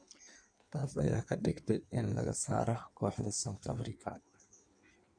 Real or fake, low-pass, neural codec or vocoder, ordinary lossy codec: fake; 9.9 kHz; codec, 16 kHz in and 24 kHz out, 1.1 kbps, FireRedTTS-2 codec; none